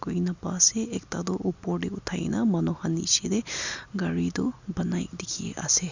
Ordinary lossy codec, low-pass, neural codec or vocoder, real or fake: Opus, 64 kbps; 7.2 kHz; none; real